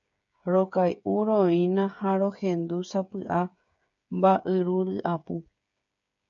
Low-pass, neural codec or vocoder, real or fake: 7.2 kHz; codec, 16 kHz, 8 kbps, FreqCodec, smaller model; fake